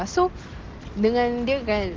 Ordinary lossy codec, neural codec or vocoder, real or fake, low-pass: Opus, 16 kbps; none; real; 7.2 kHz